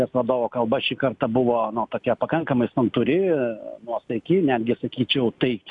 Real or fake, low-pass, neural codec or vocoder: real; 10.8 kHz; none